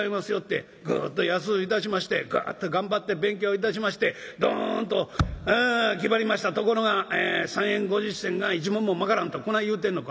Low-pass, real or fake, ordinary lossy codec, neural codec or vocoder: none; real; none; none